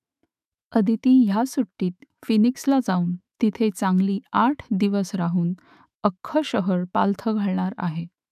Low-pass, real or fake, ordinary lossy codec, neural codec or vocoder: 14.4 kHz; fake; none; autoencoder, 48 kHz, 128 numbers a frame, DAC-VAE, trained on Japanese speech